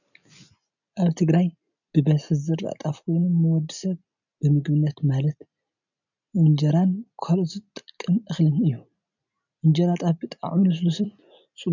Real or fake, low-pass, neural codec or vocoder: real; 7.2 kHz; none